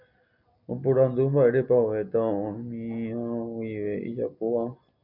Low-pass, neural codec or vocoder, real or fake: 5.4 kHz; none; real